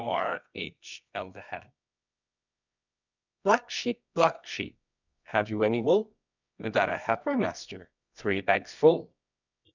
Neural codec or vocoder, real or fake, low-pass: codec, 24 kHz, 0.9 kbps, WavTokenizer, medium music audio release; fake; 7.2 kHz